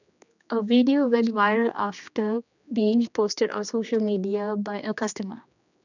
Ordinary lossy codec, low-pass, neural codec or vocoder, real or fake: none; 7.2 kHz; codec, 16 kHz, 2 kbps, X-Codec, HuBERT features, trained on general audio; fake